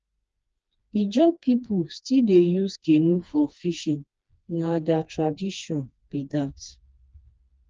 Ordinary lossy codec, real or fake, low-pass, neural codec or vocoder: Opus, 24 kbps; fake; 7.2 kHz; codec, 16 kHz, 2 kbps, FreqCodec, smaller model